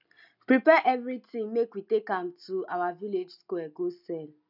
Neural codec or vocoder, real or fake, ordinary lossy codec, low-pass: none; real; none; 5.4 kHz